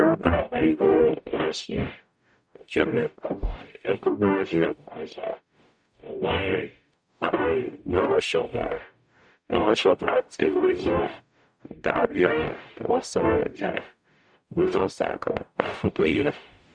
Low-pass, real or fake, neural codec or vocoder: 9.9 kHz; fake; codec, 44.1 kHz, 0.9 kbps, DAC